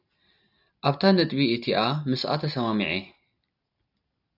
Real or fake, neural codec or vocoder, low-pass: real; none; 5.4 kHz